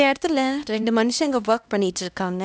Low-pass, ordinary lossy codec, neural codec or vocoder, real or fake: none; none; codec, 16 kHz, 1 kbps, X-Codec, HuBERT features, trained on LibriSpeech; fake